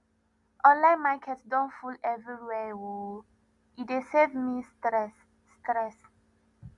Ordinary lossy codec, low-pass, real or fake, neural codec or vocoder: none; 10.8 kHz; real; none